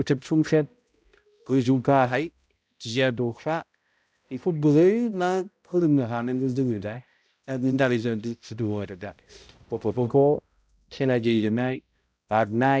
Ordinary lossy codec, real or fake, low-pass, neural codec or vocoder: none; fake; none; codec, 16 kHz, 0.5 kbps, X-Codec, HuBERT features, trained on balanced general audio